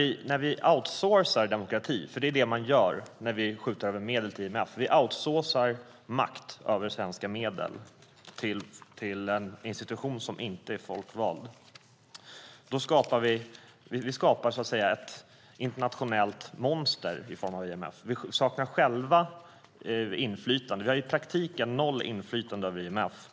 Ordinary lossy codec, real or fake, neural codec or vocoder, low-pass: none; real; none; none